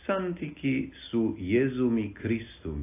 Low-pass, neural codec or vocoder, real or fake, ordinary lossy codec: 3.6 kHz; none; real; AAC, 24 kbps